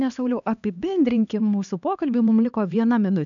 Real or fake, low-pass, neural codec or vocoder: fake; 7.2 kHz; codec, 16 kHz, 2 kbps, X-Codec, HuBERT features, trained on LibriSpeech